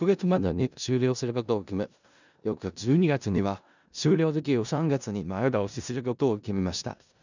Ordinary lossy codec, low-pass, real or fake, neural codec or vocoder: none; 7.2 kHz; fake; codec, 16 kHz in and 24 kHz out, 0.4 kbps, LongCat-Audio-Codec, four codebook decoder